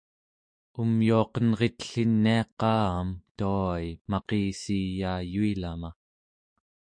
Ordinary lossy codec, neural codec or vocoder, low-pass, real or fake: MP3, 48 kbps; autoencoder, 48 kHz, 128 numbers a frame, DAC-VAE, trained on Japanese speech; 9.9 kHz; fake